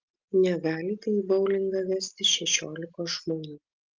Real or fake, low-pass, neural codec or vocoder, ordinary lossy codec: real; 7.2 kHz; none; Opus, 24 kbps